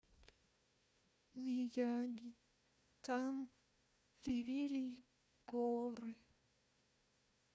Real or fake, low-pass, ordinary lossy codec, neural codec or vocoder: fake; none; none; codec, 16 kHz, 1 kbps, FunCodec, trained on Chinese and English, 50 frames a second